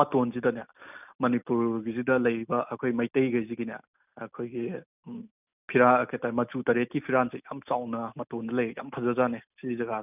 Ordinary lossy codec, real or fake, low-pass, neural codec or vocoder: none; real; 3.6 kHz; none